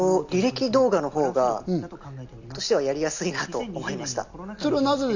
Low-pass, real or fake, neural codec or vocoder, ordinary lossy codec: 7.2 kHz; real; none; none